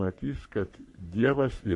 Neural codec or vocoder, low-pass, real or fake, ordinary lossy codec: codec, 44.1 kHz, 3.4 kbps, Pupu-Codec; 10.8 kHz; fake; MP3, 48 kbps